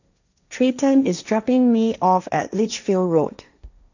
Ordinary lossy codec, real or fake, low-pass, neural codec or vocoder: none; fake; 7.2 kHz; codec, 16 kHz, 1.1 kbps, Voila-Tokenizer